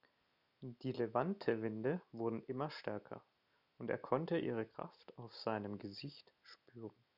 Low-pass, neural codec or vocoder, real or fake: 5.4 kHz; none; real